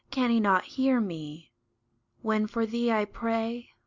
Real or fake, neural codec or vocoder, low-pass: real; none; 7.2 kHz